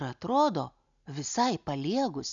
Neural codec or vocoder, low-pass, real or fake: none; 7.2 kHz; real